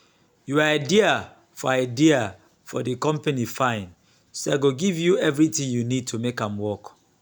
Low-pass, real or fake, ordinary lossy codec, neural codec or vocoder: none; real; none; none